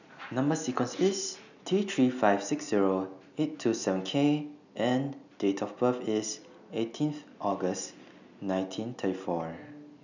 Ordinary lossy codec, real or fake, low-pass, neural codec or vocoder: none; real; 7.2 kHz; none